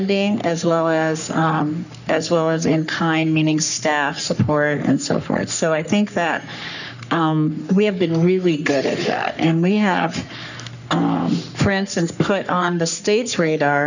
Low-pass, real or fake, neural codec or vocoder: 7.2 kHz; fake; codec, 44.1 kHz, 3.4 kbps, Pupu-Codec